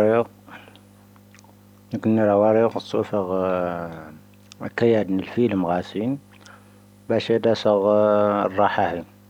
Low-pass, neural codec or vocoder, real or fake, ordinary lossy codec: 19.8 kHz; none; real; none